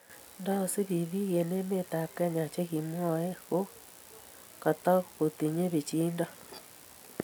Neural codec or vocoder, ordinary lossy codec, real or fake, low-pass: none; none; real; none